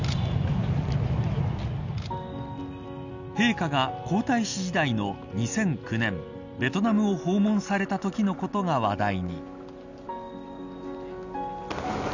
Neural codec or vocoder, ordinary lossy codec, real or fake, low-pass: none; none; real; 7.2 kHz